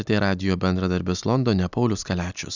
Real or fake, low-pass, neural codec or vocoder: real; 7.2 kHz; none